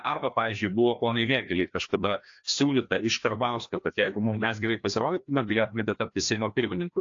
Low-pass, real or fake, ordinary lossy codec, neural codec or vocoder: 7.2 kHz; fake; AAC, 48 kbps; codec, 16 kHz, 1 kbps, FreqCodec, larger model